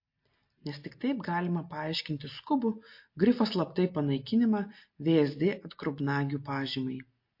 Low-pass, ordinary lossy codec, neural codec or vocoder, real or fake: 5.4 kHz; MP3, 32 kbps; none; real